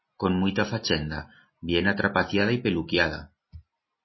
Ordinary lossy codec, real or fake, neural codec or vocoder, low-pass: MP3, 24 kbps; real; none; 7.2 kHz